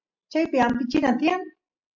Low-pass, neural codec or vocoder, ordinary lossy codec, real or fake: 7.2 kHz; none; MP3, 64 kbps; real